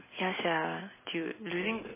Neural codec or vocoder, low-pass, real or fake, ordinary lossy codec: none; 3.6 kHz; real; MP3, 16 kbps